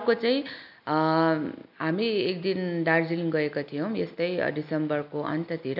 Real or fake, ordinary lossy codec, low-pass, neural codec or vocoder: real; none; 5.4 kHz; none